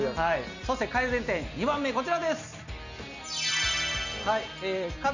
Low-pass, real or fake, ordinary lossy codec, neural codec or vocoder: 7.2 kHz; real; none; none